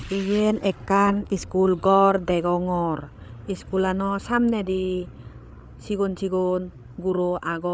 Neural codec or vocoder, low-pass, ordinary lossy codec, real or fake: codec, 16 kHz, 8 kbps, FreqCodec, larger model; none; none; fake